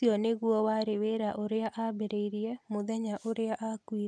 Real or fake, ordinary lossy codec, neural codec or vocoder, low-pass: real; none; none; none